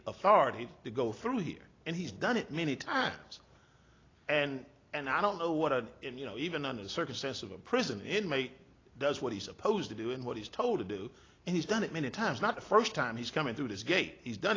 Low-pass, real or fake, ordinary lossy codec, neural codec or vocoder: 7.2 kHz; real; AAC, 32 kbps; none